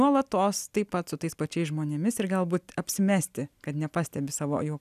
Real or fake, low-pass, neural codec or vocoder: real; 14.4 kHz; none